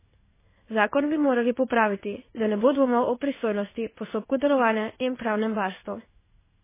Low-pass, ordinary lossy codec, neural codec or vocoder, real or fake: 3.6 kHz; MP3, 16 kbps; codec, 16 kHz in and 24 kHz out, 1 kbps, XY-Tokenizer; fake